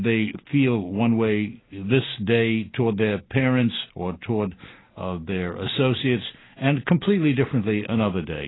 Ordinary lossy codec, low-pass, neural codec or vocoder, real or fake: AAC, 16 kbps; 7.2 kHz; none; real